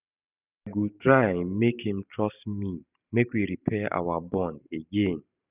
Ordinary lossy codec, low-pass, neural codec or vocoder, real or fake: none; 3.6 kHz; vocoder, 44.1 kHz, 128 mel bands every 512 samples, BigVGAN v2; fake